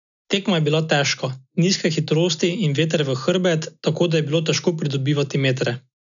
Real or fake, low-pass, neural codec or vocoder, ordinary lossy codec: real; 7.2 kHz; none; none